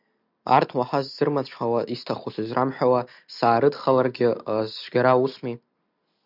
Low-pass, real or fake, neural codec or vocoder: 5.4 kHz; real; none